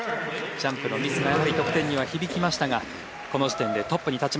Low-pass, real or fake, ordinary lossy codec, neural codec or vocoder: none; real; none; none